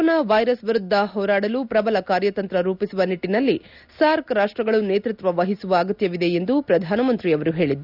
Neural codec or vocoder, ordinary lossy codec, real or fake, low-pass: none; none; real; 5.4 kHz